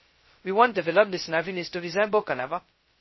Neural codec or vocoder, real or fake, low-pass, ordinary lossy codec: codec, 16 kHz, 0.2 kbps, FocalCodec; fake; 7.2 kHz; MP3, 24 kbps